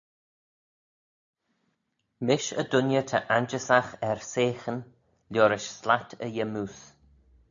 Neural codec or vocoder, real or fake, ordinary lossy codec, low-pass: none; real; AAC, 64 kbps; 7.2 kHz